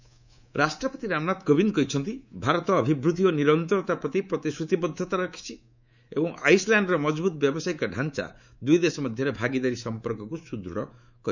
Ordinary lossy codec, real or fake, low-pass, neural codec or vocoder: none; fake; 7.2 kHz; codec, 24 kHz, 3.1 kbps, DualCodec